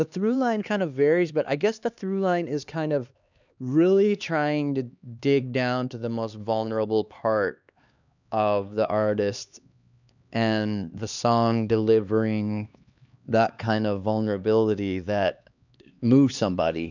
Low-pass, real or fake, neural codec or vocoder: 7.2 kHz; fake; codec, 16 kHz, 2 kbps, X-Codec, HuBERT features, trained on LibriSpeech